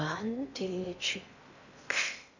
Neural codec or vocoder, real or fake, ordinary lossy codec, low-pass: codec, 16 kHz in and 24 kHz out, 0.8 kbps, FocalCodec, streaming, 65536 codes; fake; none; 7.2 kHz